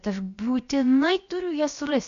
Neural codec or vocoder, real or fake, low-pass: codec, 16 kHz, about 1 kbps, DyCAST, with the encoder's durations; fake; 7.2 kHz